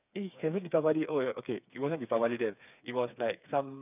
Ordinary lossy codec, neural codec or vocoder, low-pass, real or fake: none; codec, 16 kHz, 4 kbps, FreqCodec, smaller model; 3.6 kHz; fake